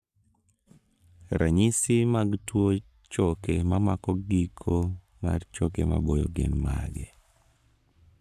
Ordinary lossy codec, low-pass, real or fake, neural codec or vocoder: none; 14.4 kHz; fake; codec, 44.1 kHz, 7.8 kbps, Pupu-Codec